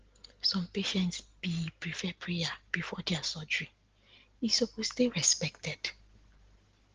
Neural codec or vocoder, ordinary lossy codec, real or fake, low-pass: none; Opus, 16 kbps; real; 7.2 kHz